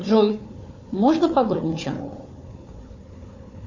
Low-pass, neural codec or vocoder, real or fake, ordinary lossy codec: 7.2 kHz; codec, 16 kHz, 4 kbps, FunCodec, trained on Chinese and English, 50 frames a second; fake; AAC, 48 kbps